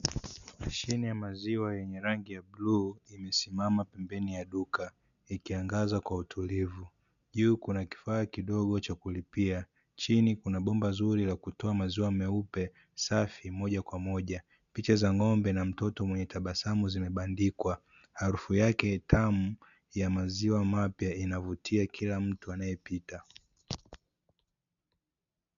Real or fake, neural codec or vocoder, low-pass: real; none; 7.2 kHz